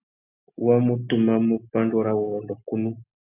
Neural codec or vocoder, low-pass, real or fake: none; 3.6 kHz; real